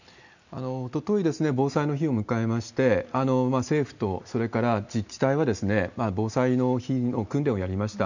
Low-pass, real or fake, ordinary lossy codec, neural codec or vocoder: 7.2 kHz; real; none; none